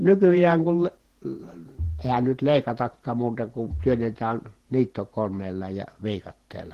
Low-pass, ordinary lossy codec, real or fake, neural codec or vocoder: 14.4 kHz; Opus, 16 kbps; fake; vocoder, 48 kHz, 128 mel bands, Vocos